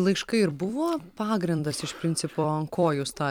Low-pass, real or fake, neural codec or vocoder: 19.8 kHz; fake; vocoder, 44.1 kHz, 128 mel bands every 256 samples, BigVGAN v2